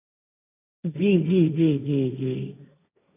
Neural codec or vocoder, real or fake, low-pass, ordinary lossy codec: codec, 16 kHz, 1.1 kbps, Voila-Tokenizer; fake; 3.6 kHz; none